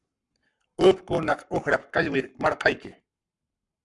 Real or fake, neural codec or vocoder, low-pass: fake; codec, 44.1 kHz, 7.8 kbps, Pupu-Codec; 10.8 kHz